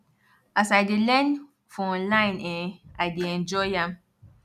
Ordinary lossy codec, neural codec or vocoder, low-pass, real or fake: none; none; 14.4 kHz; real